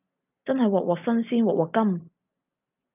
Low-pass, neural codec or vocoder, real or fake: 3.6 kHz; none; real